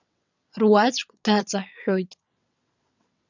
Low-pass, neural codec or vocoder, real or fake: 7.2 kHz; vocoder, 22.05 kHz, 80 mel bands, WaveNeXt; fake